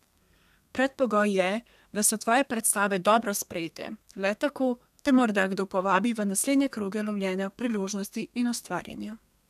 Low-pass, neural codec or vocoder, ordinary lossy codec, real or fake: 14.4 kHz; codec, 32 kHz, 1.9 kbps, SNAC; none; fake